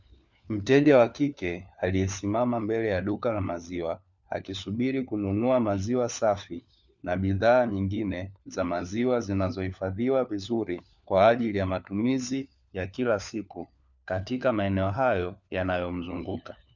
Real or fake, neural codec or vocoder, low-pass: fake; codec, 16 kHz, 4 kbps, FunCodec, trained on LibriTTS, 50 frames a second; 7.2 kHz